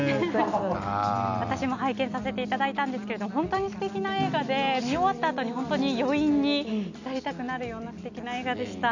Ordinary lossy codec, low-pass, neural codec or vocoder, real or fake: none; 7.2 kHz; none; real